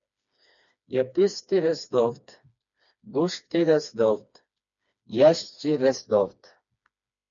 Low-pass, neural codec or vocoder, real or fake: 7.2 kHz; codec, 16 kHz, 2 kbps, FreqCodec, smaller model; fake